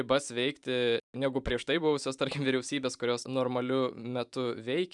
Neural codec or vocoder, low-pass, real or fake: vocoder, 44.1 kHz, 128 mel bands every 512 samples, BigVGAN v2; 10.8 kHz; fake